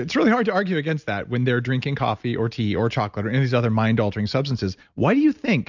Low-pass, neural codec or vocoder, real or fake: 7.2 kHz; none; real